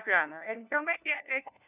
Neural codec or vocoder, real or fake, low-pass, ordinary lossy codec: codec, 16 kHz, 1 kbps, FunCodec, trained on LibriTTS, 50 frames a second; fake; 3.6 kHz; none